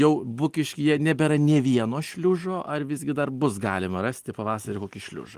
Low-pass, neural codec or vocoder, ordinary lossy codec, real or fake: 14.4 kHz; none; Opus, 24 kbps; real